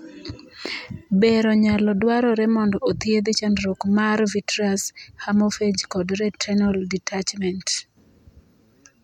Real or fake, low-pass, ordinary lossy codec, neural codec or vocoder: real; 19.8 kHz; MP3, 96 kbps; none